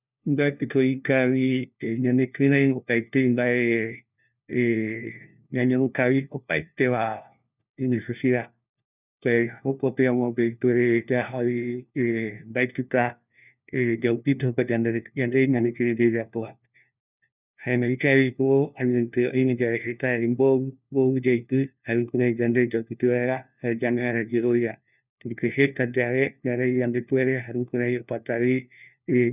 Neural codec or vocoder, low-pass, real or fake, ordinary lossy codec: codec, 16 kHz, 1 kbps, FunCodec, trained on LibriTTS, 50 frames a second; 3.6 kHz; fake; none